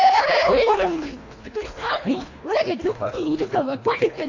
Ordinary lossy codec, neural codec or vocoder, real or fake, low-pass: AAC, 32 kbps; codec, 24 kHz, 1.5 kbps, HILCodec; fake; 7.2 kHz